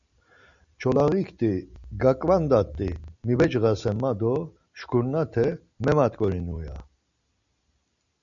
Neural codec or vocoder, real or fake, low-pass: none; real; 7.2 kHz